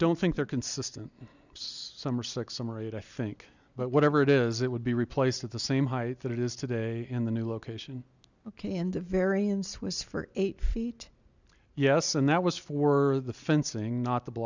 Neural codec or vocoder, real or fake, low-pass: none; real; 7.2 kHz